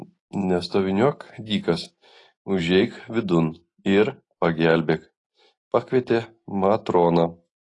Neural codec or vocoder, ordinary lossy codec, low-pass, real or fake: none; AAC, 32 kbps; 10.8 kHz; real